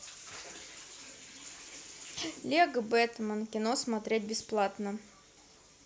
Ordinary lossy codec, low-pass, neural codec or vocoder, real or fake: none; none; none; real